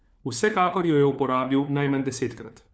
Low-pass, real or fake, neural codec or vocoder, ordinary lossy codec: none; fake; codec, 16 kHz, 4 kbps, FunCodec, trained on LibriTTS, 50 frames a second; none